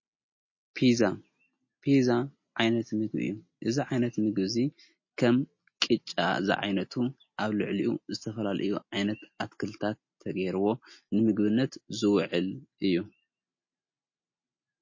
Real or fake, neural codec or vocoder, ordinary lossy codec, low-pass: real; none; MP3, 32 kbps; 7.2 kHz